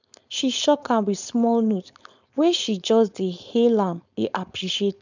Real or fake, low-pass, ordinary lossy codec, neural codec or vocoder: fake; 7.2 kHz; none; codec, 16 kHz, 4.8 kbps, FACodec